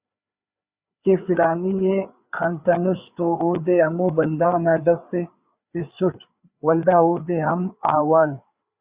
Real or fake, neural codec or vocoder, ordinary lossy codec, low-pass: fake; codec, 16 kHz, 4 kbps, FreqCodec, larger model; Opus, 64 kbps; 3.6 kHz